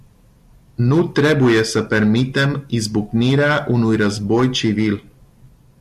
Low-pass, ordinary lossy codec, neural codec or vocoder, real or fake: 14.4 kHz; MP3, 64 kbps; none; real